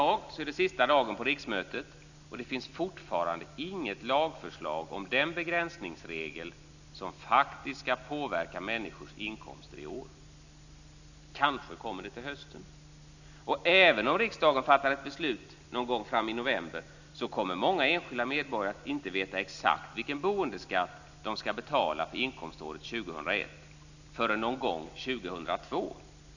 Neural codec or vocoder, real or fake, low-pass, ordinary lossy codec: none; real; 7.2 kHz; none